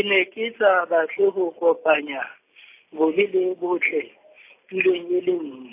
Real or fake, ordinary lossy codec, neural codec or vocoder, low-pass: real; AAC, 24 kbps; none; 3.6 kHz